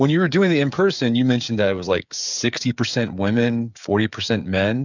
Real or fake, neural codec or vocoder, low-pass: fake; codec, 16 kHz, 8 kbps, FreqCodec, smaller model; 7.2 kHz